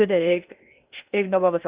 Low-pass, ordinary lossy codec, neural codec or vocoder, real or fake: 3.6 kHz; Opus, 64 kbps; codec, 16 kHz in and 24 kHz out, 0.6 kbps, FocalCodec, streaming, 2048 codes; fake